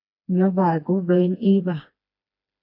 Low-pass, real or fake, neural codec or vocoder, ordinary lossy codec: 5.4 kHz; fake; codec, 16 kHz, 2 kbps, FreqCodec, smaller model; AAC, 48 kbps